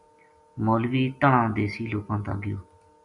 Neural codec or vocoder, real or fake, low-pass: none; real; 10.8 kHz